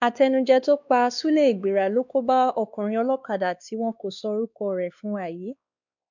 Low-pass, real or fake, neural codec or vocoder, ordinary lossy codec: 7.2 kHz; fake; codec, 16 kHz, 2 kbps, X-Codec, WavLM features, trained on Multilingual LibriSpeech; none